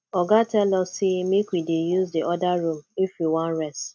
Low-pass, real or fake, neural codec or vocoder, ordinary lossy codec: none; real; none; none